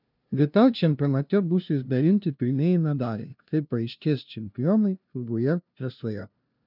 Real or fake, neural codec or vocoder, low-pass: fake; codec, 16 kHz, 0.5 kbps, FunCodec, trained on LibriTTS, 25 frames a second; 5.4 kHz